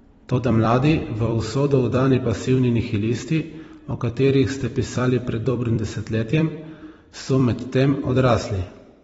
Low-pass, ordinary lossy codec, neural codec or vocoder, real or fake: 19.8 kHz; AAC, 24 kbps; vocoder, 44.1 kHz, 128 mel bands every 256 samples, BigVGAN v2; fake